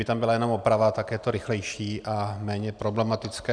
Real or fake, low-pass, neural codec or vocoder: real; 9.9 kHz; none